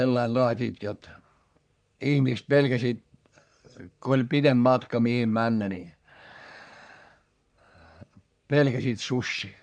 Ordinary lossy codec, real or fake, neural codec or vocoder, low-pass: none; fake; codec, 44.1 kHz, 3.4 kbps, Pupu-Codec; 9.9 kHz